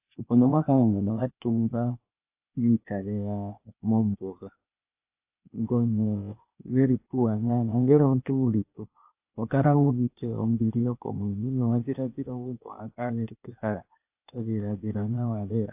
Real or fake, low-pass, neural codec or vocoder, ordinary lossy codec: fake; 3.6 kHz; codec, 16 kHz, 0.8 kbps, ZipCodec; AAC, 32 kbps